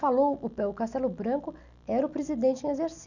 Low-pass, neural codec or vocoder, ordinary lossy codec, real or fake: 7.2 kHz; none; none; real